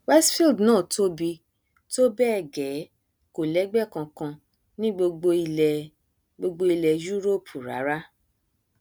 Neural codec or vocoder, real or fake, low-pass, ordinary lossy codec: none; real; none; none